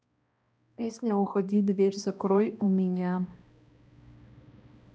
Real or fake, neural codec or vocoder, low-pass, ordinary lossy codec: fake; codec, 16 kHz, 1 kbps, X-Codec, HuBERT features, trained on balanced general audio; none; none